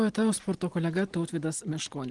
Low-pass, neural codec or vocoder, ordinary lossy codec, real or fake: 10.8 kHz; none; Opus, 24 kbps; real